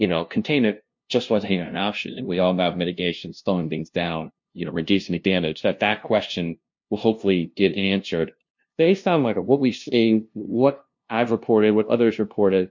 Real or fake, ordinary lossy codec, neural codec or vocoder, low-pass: fake; MP3, 48 kbps; codec, 16 kHz, 0.5 kbps, FunCodec, trained on LibriTTS, 25 frames a second; 7.2 kHz